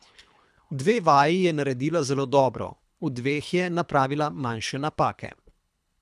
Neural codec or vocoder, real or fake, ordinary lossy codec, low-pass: codec, 24 kHz, 3 kbps, HILCodec; fake; none; none